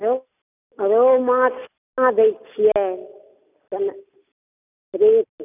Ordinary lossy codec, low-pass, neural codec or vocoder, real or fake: none; 3.6 kHz; none; real